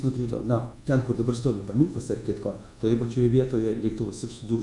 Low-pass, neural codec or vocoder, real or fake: 10.8 kHz; codec, 24 kHz, 1.2 kbps, DualCodec; fake